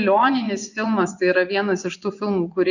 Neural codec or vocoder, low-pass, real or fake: vocoder, 24 kHz, 100 mel bands, Vocos; 7.2 kHz; fake